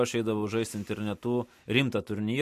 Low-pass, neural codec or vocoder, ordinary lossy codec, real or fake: 14.4 kHz; none; MP3, 64 kbps; real